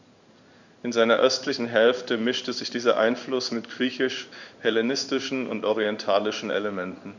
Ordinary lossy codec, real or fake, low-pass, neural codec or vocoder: none; fake; 7.2 kHz; codec, 16 kHz in and 24 kHz out, 1 kbps, XY-Tokenizer